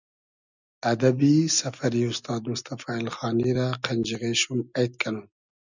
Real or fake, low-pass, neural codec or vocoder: real; 7.2 kHz; none